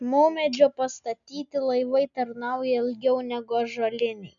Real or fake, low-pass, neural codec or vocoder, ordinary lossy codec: real; 7.2 kHz; none; MP3, 96 kbps